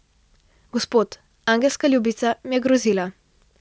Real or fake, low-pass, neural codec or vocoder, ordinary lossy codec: real; none; none; none